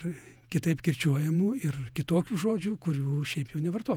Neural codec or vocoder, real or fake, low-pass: vocoder, 48 kHz, 128 mel bands, Vocos; fake; 19.8 kHz